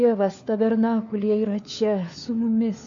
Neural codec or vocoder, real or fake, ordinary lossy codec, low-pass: codec, 16 kHz, 4 kbps, FunCodec, trained on LibriTTS, 50 frames a second; fake; AAC, 64 kbps; 7.2 kHz